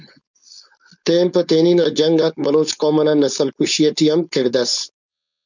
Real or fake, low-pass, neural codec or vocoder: fake; 7.2 kHz; codec, 16 kHz, 4.8 kbps, FACodec